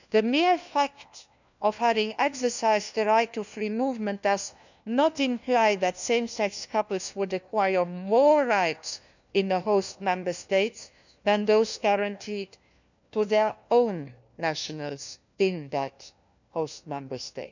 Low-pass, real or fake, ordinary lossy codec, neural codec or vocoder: 7.2 kHz; fake; none; codec, 16 kHz, 1 kbps, FunCodec, trained on LibriTTS, 50 frames a second